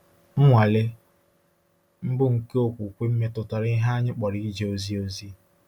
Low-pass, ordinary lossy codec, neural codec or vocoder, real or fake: 19.8 kHz; none; none; real